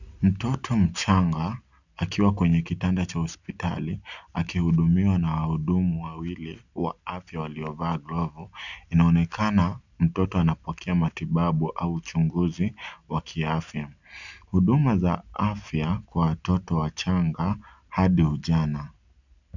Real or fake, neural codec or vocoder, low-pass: real; none; 7.2 kHz